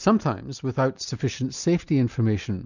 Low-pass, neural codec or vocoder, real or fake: 7.2 kHz; none; real